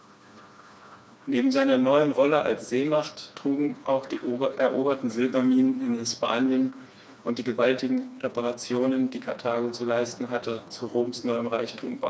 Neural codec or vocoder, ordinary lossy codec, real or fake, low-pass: codec, 16 kHz, 2 kbps, FreqCodec, smaller model; none; fake; none